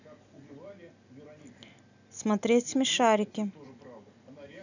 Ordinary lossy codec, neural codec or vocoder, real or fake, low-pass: none; none; real; 7.2 kHz